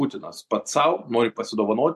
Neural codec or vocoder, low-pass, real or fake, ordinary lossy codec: none; 14.4 kHz; real; MP3, 64 kbps